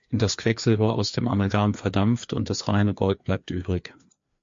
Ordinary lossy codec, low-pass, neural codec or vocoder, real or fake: MP3, 48 kbps; 7.2 kHz; codec, 16 kHz, 2 kbps, FreqCodec, larger model; fake